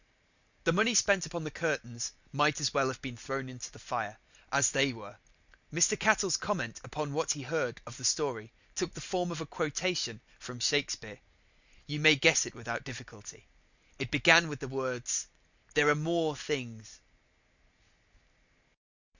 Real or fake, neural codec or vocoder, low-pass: real; none; 7.2 kHz